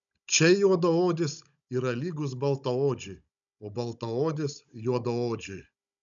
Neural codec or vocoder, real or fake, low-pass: codec, 16 kHz, 16 kbps, FunCodec, trained on Chinese and English, 50 frames a second; fake; 7.2 kHz